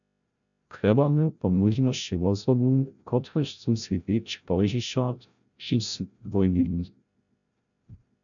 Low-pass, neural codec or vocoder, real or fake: 7.2 kHz; codec, 16 kHz, 0.5 kbps, FreqCodec, larger model; fake